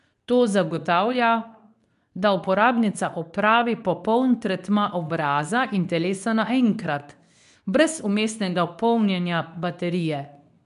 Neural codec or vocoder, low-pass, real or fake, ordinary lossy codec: codec, 24 kHz, 0.9 kbps, WavTokenizer, medium speech release version 2; 10.8 kHz; fake; none